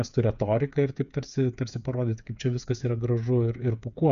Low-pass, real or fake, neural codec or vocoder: 7.2 kHz; fake; codec, 16 kHz, 8 kbps, FreqCodec, smaller model